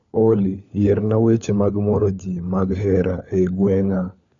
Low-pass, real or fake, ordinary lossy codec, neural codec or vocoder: 7.2 kHz; fake; none; codec, 16 kHz, 4 kbps, FunCodec, trained on LibriTTS, 50 frames a second